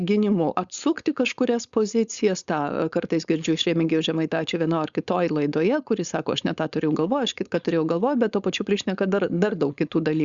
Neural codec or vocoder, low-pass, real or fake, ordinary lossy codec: codec, 16 kHz, 4.8 kbps, FACodec; 7.2 kHz; fake; Opus, 64 kbps